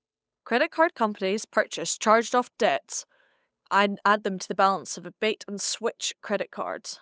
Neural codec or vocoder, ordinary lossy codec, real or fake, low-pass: codec, 16 kHz, 8 kbps, FunCodec, trained on Chinese and English, 25 frames a second; none; fake; none